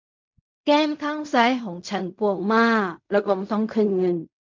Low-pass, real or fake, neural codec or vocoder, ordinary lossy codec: 7.2 kHz; fake; codec, 16 kHz in and 24 kHz out, 0.4 kbps, LongCat-Audio-Codec, fine tuned four codebook decoder; MP3, 64 kbps